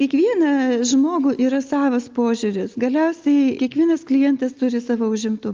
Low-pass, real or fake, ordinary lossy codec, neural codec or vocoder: 7.2 kHz; real; Opus, 32 kbps; none